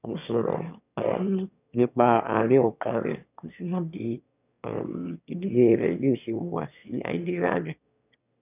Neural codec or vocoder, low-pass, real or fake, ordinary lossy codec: autoencoder, 22.05 kHz, a latent of 192 numbers a frame, VITS, trained on one speaker; 3.6 kHz; fake; none